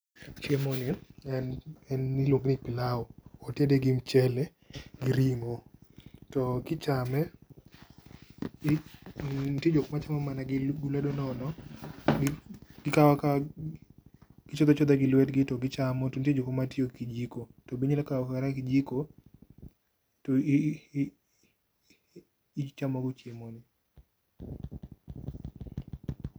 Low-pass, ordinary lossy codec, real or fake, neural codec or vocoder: none; none; real; none